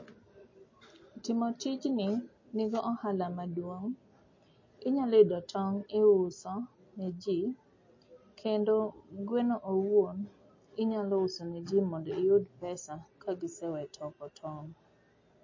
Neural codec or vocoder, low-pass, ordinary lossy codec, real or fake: none; 7.2 kHz; MP3, 32 kbps; real